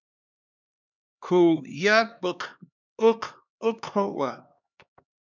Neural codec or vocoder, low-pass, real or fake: codec, 16 kHz, 2 kbps, X-Codec, HuBERT features, trained on LibriSpeech; 7.2 kHz; fake